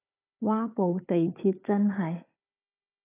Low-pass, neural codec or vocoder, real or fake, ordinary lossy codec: 3.6 kHz; codec, 16 kHz, 4 kbps, FunCodec, trained on Chinese and English, 50 frames a second; fake; AAC, 16 kbps